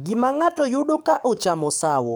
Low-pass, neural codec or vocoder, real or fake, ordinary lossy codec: none; codec, 44.1 kHz, 7.8 kbps, DAC; fake; none